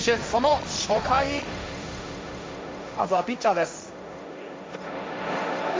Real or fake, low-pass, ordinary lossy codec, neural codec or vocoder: fake; none; none; codec, 16 kHz, 1.1 kbps, Voila-Tokenizer